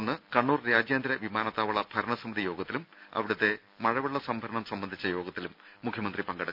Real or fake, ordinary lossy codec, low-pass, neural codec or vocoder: real; none; 5.4 kHz; none